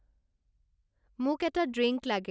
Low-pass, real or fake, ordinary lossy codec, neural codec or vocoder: none; real; none; none